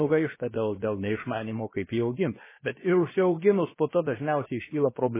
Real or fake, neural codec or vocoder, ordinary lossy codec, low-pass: fake; codec, 16 kHz, 0.7 kbps, FocalCodec; MP3, 16 kbps; 3.6 kHz